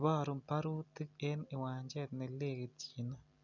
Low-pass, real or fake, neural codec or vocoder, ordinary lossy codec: 7.2 kHz; real; none; none